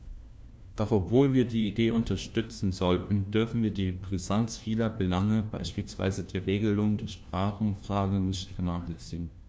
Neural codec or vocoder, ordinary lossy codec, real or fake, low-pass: codec, 16 kHz, 1 kbps, FunCodec, trained on LibriTTS, 50 frames a second; none; fake; none